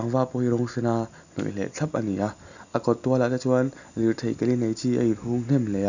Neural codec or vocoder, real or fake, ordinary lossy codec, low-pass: none; real; none; 7.2 kHz